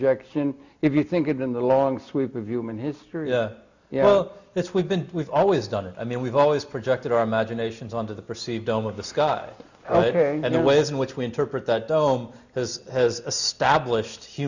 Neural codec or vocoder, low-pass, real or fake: none; 7.2 kHz; real